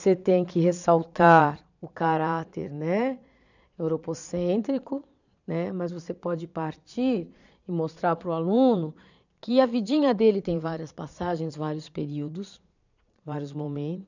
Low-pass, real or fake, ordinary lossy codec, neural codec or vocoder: 7.2 kHz; fake; none; vocoder, 22.05 kHz, 80 mel bands, Vocos